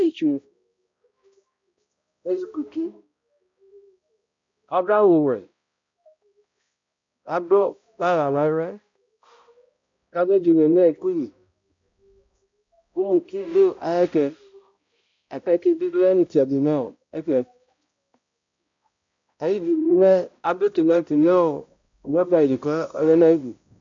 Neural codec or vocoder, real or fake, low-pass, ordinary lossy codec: codec, 16 kHz, 0.5 kbps, X-Codec, HuBERT features, trained on balanced general audio; fake; 7.2 kHz; MP3, 48 kbps